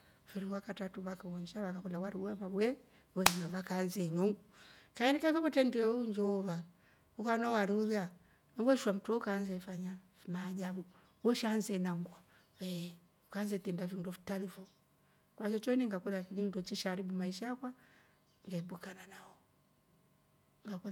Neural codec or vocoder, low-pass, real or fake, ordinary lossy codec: vocoder, 48 kHz, 128 mel bands, Vocos; 19.8 kHz; fake; none